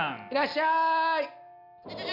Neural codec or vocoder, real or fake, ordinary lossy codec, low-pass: none; real; none; 5.4 kHz